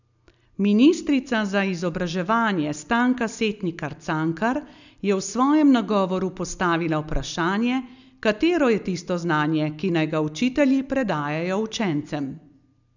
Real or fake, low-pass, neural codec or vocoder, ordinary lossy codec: real; 7.2 kHz; none; none